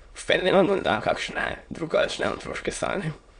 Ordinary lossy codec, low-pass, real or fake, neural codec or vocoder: none; 9.9 kHz; fake; autoencoder, 22.05 kHz, a latent of 192 numbers a frame, VITS, trained on many speakers